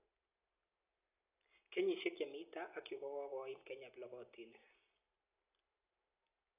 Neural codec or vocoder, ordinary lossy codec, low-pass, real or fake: none; AAC, 32 kbps; 3.6 kHz; real